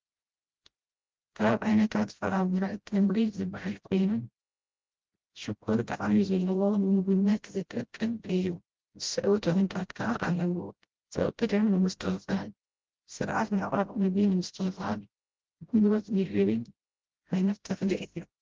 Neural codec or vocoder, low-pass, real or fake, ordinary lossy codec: codec, 16 kHz, 0.5 kbps, FreqCodec, smaller model; 7.2 kHz; fake; Opus, 24 kbps